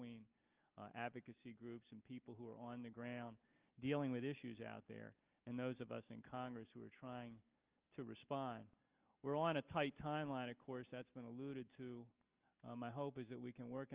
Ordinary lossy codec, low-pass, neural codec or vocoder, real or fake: Opus, 24 kbps; 3.6 kHz; none; real